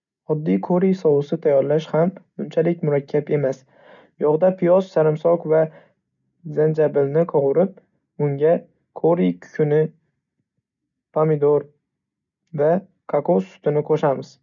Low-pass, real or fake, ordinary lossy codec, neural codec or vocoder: 7.2 kHz; real; none; none